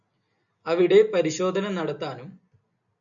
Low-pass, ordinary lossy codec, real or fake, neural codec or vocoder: 7.2 kHz; MP3, 96 kbps; real; none